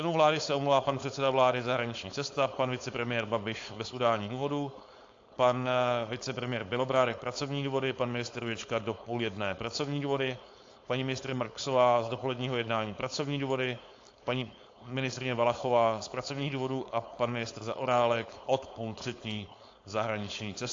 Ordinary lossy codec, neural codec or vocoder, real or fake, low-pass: AAC, 48 kbps; codec, 16 kHz, 4.8 kbps, FACodec; fake; 7.2 kHz